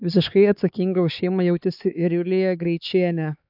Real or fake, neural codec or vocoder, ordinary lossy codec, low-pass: fake; codec, 16 kHz, 4 kbps, X-Codec, HuBERT features, trained on LibriSpeech; AAC, 48 kbps; 5.4 kHz